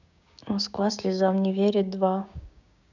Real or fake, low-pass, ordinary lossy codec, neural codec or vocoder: fake; 7.2 kHz; none; codec, 16 kHz, 6 kbps, DAC